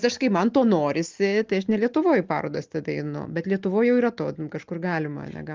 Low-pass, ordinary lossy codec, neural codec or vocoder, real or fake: 7.2 kHz; Opus, 32 kbps; none; real